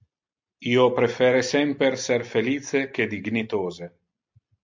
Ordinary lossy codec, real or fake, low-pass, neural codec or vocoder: MP3, 64 kbps; real; 7.2 kHz; none